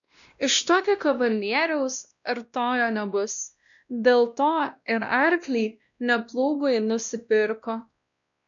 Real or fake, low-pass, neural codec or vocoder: fake; 7.2 kHz; codec, 16 kHz, 1 kbps, X-Codec, WavLM features, trained on Multilingual LibriSpeech